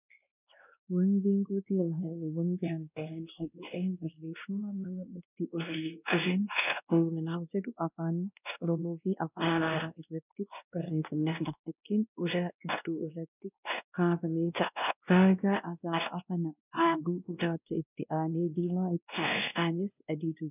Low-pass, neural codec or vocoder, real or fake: 3.6 kHz; codec, 16 kHz, 1 kbps, X-Codec, WavLM features, trained on Multilingual LibriSpeech; fake